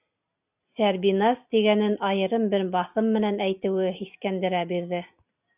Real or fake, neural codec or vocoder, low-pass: real; none; 3.6 kHz